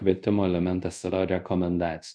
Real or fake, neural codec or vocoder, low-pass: fake; codec, 24 kHz, 0.5 kbps, DualCodec; 9.9 kHz